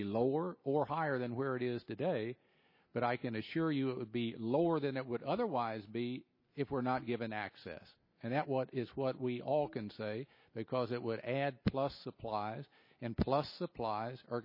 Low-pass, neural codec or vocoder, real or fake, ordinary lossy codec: 5.4 kHz; none; real; MP3, 24 kbps